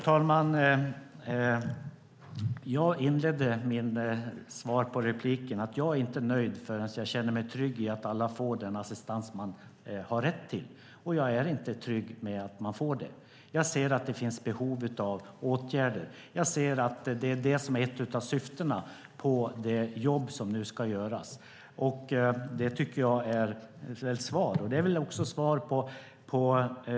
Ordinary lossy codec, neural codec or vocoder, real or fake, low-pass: none; none; real; none